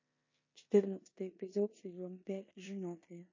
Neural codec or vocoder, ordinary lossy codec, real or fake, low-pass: codec, 16 kHz in and 24 kHz out, 0.9 kbps, LongCat-Audio-Codec, four codebook decoder; MP3, 32 kbps; fake; 7.2 kHz